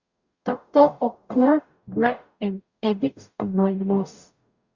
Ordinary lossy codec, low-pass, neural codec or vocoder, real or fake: Opus, 64 kbps; 7.2 kHz; codec, 44.1 kHz, 0.9 kbps, DAC; fake